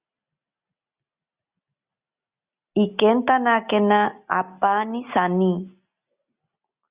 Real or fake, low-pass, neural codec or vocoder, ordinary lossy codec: real; 3.6 kHz; none; Opus, 64 kbps